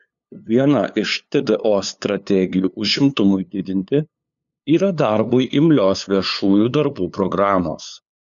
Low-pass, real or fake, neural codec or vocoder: 7.2 kHz; fake; codec, 16 kHz, 2 kbps, FunCodec, trained on LibriTTS, 25 frames a second